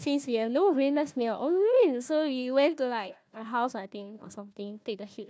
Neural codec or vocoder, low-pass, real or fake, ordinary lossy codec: codec, 16 kHz, 1 kbps, FunCodec, trained on Chinese and English, 50 frames a second; none; fake; none